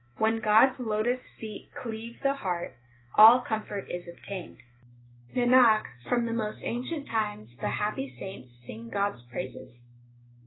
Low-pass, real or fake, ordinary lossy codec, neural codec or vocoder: 7.2 kHz; fake; AAC, 16 kbps; autoencoder, 48 kHz, 128 numbers a frame, DAC-VAE, trained on Japanese speech